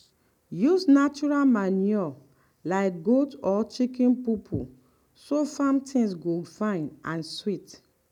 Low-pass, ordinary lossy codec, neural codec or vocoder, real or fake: 19.8 kHz; none; none; real